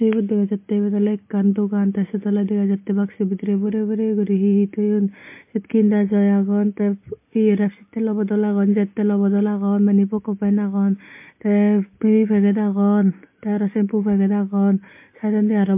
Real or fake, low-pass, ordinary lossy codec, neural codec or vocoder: real; 3.6 kHz; MP3, 24 kbps; none